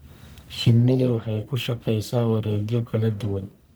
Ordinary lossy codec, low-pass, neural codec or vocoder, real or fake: none; none; codec, 44.1 kHz, 1.7 kbps, Pupu-Codec; fake